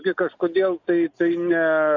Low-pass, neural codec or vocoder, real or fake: 7.2 kHz; none; real